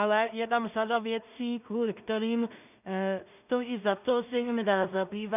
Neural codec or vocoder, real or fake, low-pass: codec, 16 kHz in and 24 kHz out, 0.4 kbps, LongCat-Audio-Codec, two codebook decoder; fake; 3.6 kHz